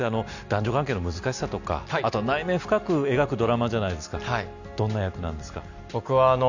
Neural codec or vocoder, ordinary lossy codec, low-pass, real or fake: none; none; 7.2 kHz; real